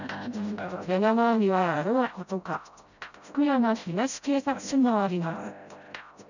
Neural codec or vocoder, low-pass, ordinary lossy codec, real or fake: codec, 16 kHz, 0.5 kbps, FreqCodec, smaller model; 7.2 kHz; none; fake